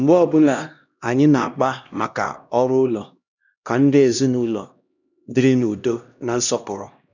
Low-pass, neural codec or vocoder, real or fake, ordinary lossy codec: 7.2 kHz; codec, 16 kHz, 1 kbps, X-Codec, HuBERT features, trained on LibriSpeech; fake; none